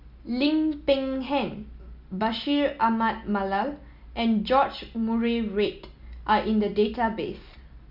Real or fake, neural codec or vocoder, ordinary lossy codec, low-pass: real; none; none; 5.4 kHz